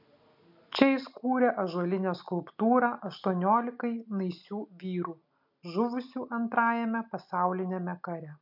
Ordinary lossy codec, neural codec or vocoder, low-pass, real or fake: MP3, 48 kbps; none; 5.4 kHz; real